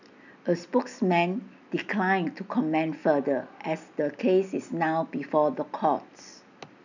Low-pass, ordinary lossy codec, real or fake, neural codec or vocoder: 7.2 kHz; none; real; none